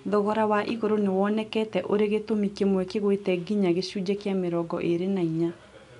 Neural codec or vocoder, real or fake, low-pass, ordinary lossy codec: none; real; 10.8 kHz; none